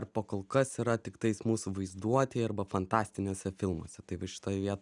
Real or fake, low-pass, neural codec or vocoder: real; 10.8 kHz; none